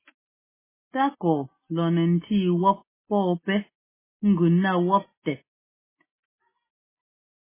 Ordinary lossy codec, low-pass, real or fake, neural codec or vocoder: MP3, 16 kbps; 3.6 kHz; real; none